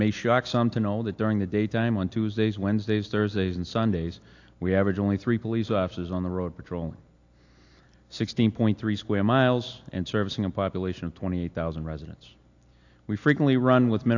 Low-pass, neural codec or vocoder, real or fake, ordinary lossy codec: 7.2 kHz; none; real; AAC, 48 kbps